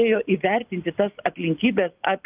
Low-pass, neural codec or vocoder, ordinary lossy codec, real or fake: 3.6 kHz; none; Opus, 24 kbps; real